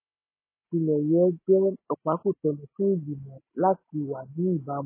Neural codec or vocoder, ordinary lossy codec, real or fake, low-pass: none; MP3, 16 kbps; real; 3.6 kHz